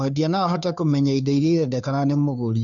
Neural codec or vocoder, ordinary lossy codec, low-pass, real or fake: codec, 16 kHz, 2 kbps, FunCodec, trained on Chinese and English, 25 frames a second; none; 7.2 kHz; fake